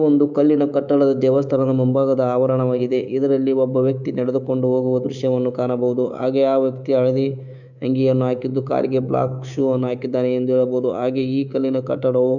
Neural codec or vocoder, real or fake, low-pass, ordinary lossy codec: codec, 24 kHz, 3.1 kbps, DualCodec; fake; 7.2 kHz; none